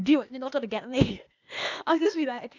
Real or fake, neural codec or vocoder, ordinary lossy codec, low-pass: fake; codec, 16 kHz, 0.8 kbps, ZipCodec; none; 7.2 kHz